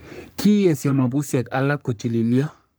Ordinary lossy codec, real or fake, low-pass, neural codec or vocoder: none; fake; none; codec, 44.1 kHz, 3.4 kbps, Pupu-Codec